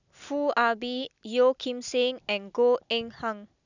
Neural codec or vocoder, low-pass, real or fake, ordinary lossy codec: none; 7.2 kHz; real; none